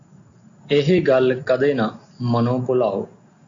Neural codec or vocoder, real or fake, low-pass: none; real; 7.2 kHz